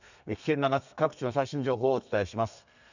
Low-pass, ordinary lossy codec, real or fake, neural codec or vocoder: 7.2 kHz; none; fake; codec, 32 kHz, 1.9 kbps, SNAC